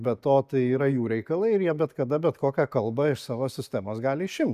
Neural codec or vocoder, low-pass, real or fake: none; 14.4 kHz; real